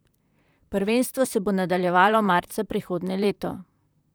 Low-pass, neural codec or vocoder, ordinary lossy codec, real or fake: none; vocoder, 44.1 kHz, 128 mel bands, Pupu-Vocoder; none; fake